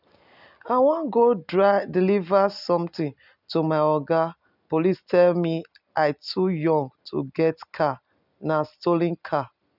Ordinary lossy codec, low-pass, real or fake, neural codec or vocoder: none; 5.4 kHz; real; none